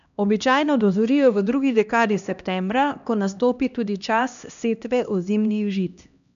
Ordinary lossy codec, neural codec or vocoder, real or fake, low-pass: none; codec, 16 kHz, 1 kbps, X-Codec, HuBERT features, trained on LibriSpeech; fake; 7.2 kHz